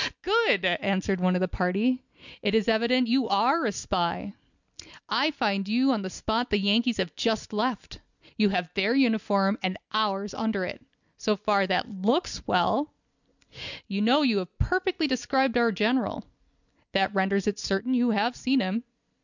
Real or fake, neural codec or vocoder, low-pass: real; none; 7.2 kHz